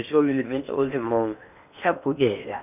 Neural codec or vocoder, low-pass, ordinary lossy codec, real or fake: codec, 16 kHz in and 24 kHz out, 0.8 kbps, FocalCodec, streaming, 65536 codes; 3.6 kHz; none; fake